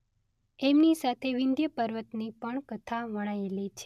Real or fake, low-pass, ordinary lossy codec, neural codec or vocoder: real; 14.4 kHz; none; none